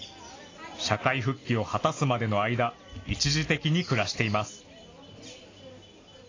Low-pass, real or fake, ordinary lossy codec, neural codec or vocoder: 7.2 kHz; real; AAC, 32 kbps; none